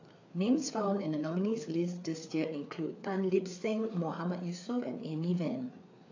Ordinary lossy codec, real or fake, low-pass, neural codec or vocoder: AAC, 48 kbps; fake; 7.2 kHz; codec, 16 kHz, 4 kbps, FreqCodec, larger model